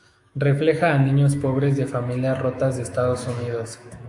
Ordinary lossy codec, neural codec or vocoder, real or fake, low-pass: AAC, 64 kbps; autoencoder, 48 kHz, 128 numbers a frame, DAC-VAE, trained on Japanese speech; fake; 10.8 kHz